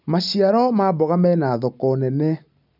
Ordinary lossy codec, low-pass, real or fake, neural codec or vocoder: none; 5.4 kHz; real; none